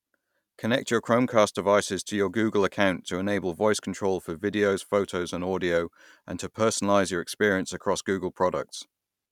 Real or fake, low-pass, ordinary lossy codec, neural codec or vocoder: real; 19.8 kHz; none; none